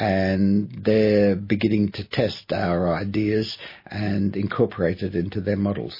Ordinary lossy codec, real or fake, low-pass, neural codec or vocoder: MP3, 24 kbps; real; 5.4 kHz; none